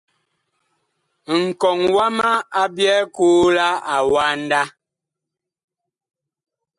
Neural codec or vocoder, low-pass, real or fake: none; 10.8 kHz; real